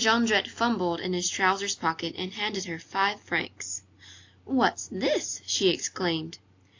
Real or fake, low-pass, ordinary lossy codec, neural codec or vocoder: real; 7.2 kHz; AAC, 48 kbps; none